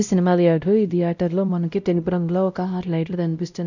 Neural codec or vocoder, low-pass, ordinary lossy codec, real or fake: codec, 16 kHz, 0.5 kbps, X-Codec, WavLM features, trained on Multilingual LibriSpeech; 7.2 kHz; none; fake